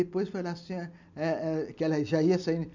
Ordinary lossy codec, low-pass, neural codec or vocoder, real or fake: MP3, 64 kbps; 7.2 kHz; none; real